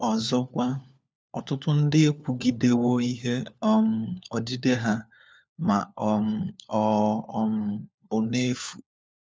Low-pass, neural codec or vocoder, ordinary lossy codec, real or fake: none; codec, 16 kHz, 4 kbps, FunCodec, trained on LibriTTS, 50 frames a second; none; fake